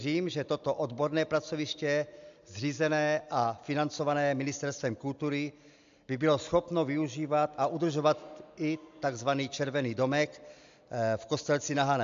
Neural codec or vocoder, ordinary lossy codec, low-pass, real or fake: none; AAC, 64 kbps; 7.2 kHz; real